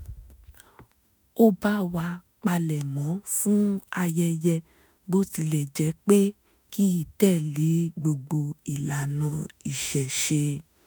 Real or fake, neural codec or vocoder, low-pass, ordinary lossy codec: fake; autoencoder, 48 kHz, 32 numbers a frame, DAC-VAE, trained on Japanese speech; none; none